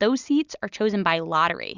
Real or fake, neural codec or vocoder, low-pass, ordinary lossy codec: real; none; 7.2 kHz; Opus, 64 kbps